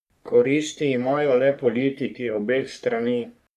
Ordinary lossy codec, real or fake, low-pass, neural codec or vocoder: none; fake; 14.4 kHz; codec, 44.1 kHz, 3.4 kbps, Pupu-Codec